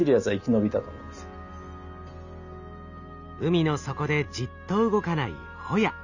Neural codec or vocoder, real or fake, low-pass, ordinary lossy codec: none; real; 7.2 kHz; none